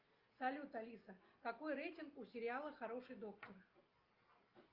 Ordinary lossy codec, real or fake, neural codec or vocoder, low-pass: Opus, 24 kbps; real; none; 5.4 kHz